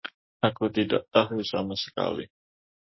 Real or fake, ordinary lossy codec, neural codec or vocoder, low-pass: fake; MP3, 24 kbps; codec, 44.1 kHz, 7.8 kbps, DAC; 7.2 kHz